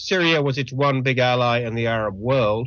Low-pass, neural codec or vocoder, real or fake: 7.2 kHz; none; real